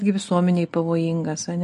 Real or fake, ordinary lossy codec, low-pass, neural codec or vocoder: real; MP3, 48 kbps; 10.8 kHz; none